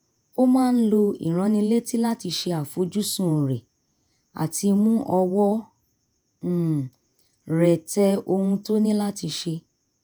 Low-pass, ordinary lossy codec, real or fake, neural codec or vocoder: none; none; fake; vocoder, 48 kHz, 128 mel bands, Vocos